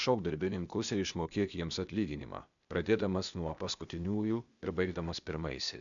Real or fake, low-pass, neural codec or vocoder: fake; 7.2 kHz; codec, 16 kHz, 0.8 kbps, ZipCodec